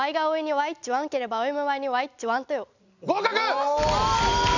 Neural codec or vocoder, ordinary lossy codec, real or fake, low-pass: none; none; real; 7.2 kHz